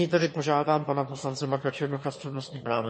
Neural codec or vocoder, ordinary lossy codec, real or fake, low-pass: autoencoder, 22.05 kHz, a latent of 192 numbers a frame, VITS, trained on one speaker; MP3, 32 kbps; fake; 9.9 kHz